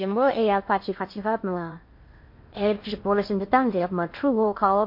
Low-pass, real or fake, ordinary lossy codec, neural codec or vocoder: 5.4 kHz; fake; MP3, 32 kbps; codec, 16 kHz in and 24 kHz out, 0.6 kbps, FocalCodec, streaming, 2048 codes